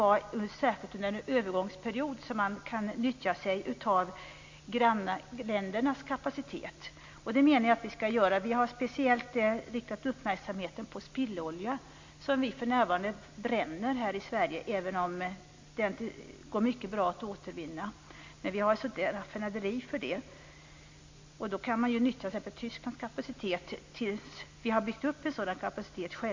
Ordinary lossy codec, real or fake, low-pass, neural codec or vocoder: MP3, 48 kbps; real; 7.2 kHz; none